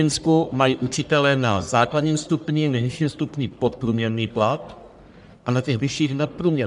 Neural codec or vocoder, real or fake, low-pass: codec, 44.1 kHz, 1.7 kbps, Pupu-Codec; fake; 10.8 kHz